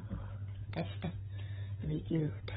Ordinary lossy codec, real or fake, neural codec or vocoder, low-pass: AAC, 16 kbps; fake; codec, 16 kHz, 16 kbps, FunCodec, trained on LibriTTS, 50 frames a second; 7.2 kHz